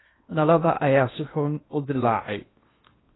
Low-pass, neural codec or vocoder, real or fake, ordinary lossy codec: 7.2 kHz; codec, 16 kHz in and 24 kHz out, 0.8 kbps, FocalCodec, streaming, 65536 codes; fake; AAC, 16 kbps